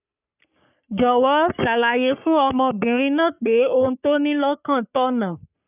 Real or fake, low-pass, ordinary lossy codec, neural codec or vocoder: fake; 3.6 kHz; none; codec, 44.1 kHz, 3.4 kbps, Pupu-Codec